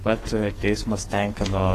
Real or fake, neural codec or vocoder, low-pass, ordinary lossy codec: fake; codec, 44.1 kHz, 2.6 kbps, SNAC; 14.4 kHz; AAC, 48 kbps